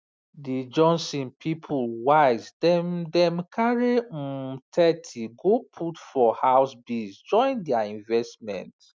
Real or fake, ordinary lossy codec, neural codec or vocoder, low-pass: real; none; none; none